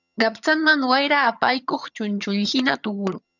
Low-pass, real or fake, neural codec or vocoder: 7.2 kHz; fake; vocoder, 22.05 kHz, 80 mel bands, HiFi-GAN